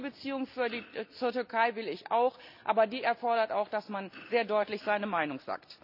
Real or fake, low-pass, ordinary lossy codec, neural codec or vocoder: real; 5.4 kHz; none; none